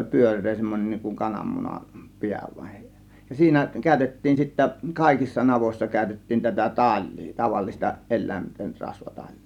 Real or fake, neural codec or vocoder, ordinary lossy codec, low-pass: real; none; none; 19.8 kHz